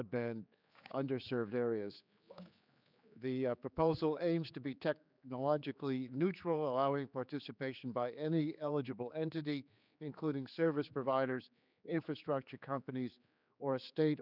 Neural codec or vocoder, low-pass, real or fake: codec, 16 kHz, 4 kbps, X-Codec, HuBERT features, trained on general audio; 5.4 kHz; fake